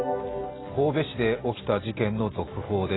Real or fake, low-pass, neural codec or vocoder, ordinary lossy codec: real; 7.2 kHz; none; AAC, 16 kbps